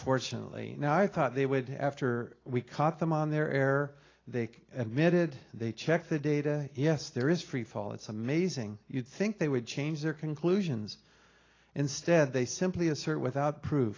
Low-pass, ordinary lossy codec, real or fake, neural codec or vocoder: 7.2 kHz; AAC, 32 kbps; real; none